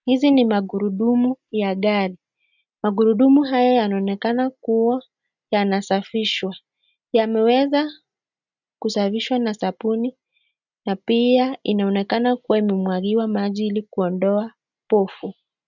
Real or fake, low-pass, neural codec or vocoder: real; 7.2 kHz; none